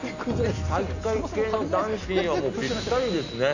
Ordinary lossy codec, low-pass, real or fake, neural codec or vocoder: none; 7.2 kHz; real; none